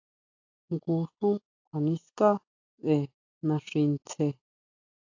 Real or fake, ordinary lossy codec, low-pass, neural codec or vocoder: real; AAC, 48 kbps; 7.2 kHz; none